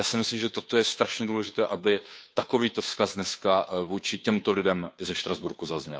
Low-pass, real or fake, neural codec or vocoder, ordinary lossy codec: none; fake; codec, 16 kHz, 2 kbps, FunCodec, trained on Chinese and English, 25 frames a second; none